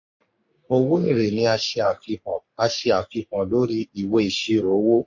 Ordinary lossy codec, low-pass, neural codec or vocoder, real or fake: MP3, 48 kbps; 7.2 kHz; codec, 44.1 kHz, 3.4 kbps, Pupu-Codec; fake